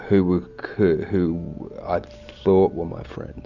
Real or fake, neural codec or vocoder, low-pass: real; none; 7.2 kHz